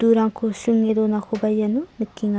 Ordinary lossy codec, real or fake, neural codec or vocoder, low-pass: none; real; none; none